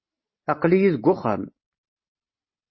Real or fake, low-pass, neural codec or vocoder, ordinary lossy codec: real; 7.2 kHz; none; MP3, 24 kbps